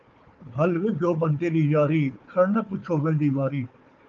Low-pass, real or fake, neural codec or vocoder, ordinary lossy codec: 7.2 kHz; fake; codec, 16 kHz, 4 kbps, FunCodec, trained on Chinese and English, 50 frames a second; Opus, 32 kbps